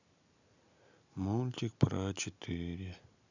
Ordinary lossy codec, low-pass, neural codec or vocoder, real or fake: none; 7.2 kHz; none; real